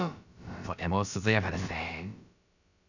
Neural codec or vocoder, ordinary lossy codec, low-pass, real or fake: codec, 16 kHz, about 1 kbps, DyCAST, with the encoder's durations; none; 7.2 kHz; fake